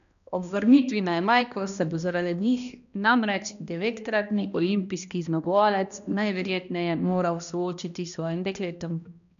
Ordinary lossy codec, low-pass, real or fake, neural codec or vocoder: none; 7.2 kHz; fake; codec, 16 kHz, 1 kbps, X-Codec, HuBERT features, trained on balanced general audio